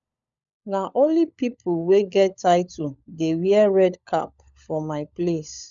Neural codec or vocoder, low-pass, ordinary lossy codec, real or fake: codec, 16 kHz, 16 kbps, FunCodec, trained on LibriTTS, 50 frames a second; 7.2 kHz; none; fake